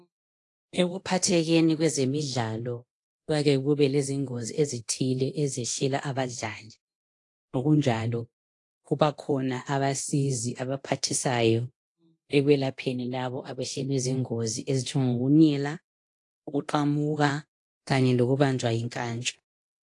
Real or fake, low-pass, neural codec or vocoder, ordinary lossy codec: fake; 10.8 kHz; codec, 24 kHz, 0.9 kbps, DualCodec; AAC, 48 kbps